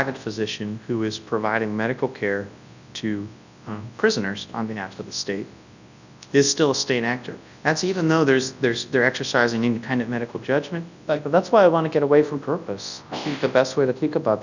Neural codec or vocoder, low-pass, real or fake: codec, 24 kHz, 0.9 kbps, WavTokenizer, large speech release; 7.2 kHz; fake